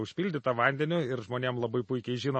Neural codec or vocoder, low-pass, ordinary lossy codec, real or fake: none; 9.9 kHz; MP3, 32 kbps; real